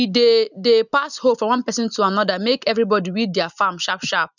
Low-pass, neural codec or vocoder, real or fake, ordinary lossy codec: 7.2 kHz; none; real; none